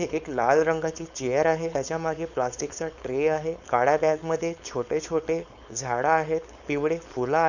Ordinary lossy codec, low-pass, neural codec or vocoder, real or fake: none; 7.2 kHz; codec, 16 kHz, 4.8 kbps, FACodec; fake